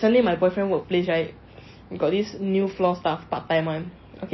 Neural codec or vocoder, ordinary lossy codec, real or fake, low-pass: none; MP3, 24 kbps; real; 7.2 kHz